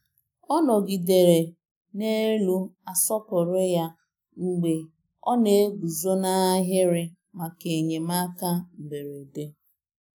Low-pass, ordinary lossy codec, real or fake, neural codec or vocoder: none; none; real; none